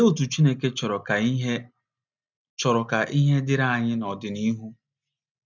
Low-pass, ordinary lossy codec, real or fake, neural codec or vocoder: 7.2 kHz; none; real; none